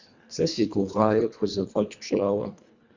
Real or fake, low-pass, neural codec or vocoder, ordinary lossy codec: fake; 7.2 kHz; codec, 24 kHz, 1.5 kbps, HILCodec; Opus, 64 kbps